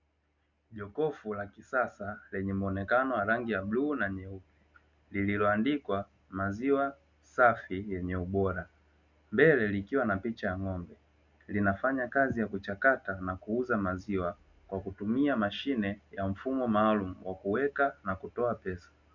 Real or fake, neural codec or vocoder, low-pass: real; none; 7.2 kHz